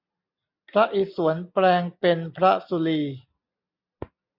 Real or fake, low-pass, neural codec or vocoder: real; 5.4 kHz; none